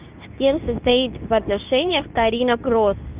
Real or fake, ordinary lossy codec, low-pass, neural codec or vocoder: fake; Opus, 24 kbps; 3.6 kHz; codec, 24 kHz, 0.9 kbps, WavTokenizer, medium speech release version 2